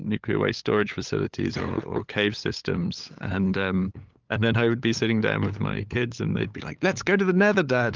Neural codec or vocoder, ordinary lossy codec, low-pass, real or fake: codec, 16 kHz, 8 kbps, FunCodec, trained on LibriTTS, 25 frames a second; Opus, 32 kbps; 7.2 kHz; fake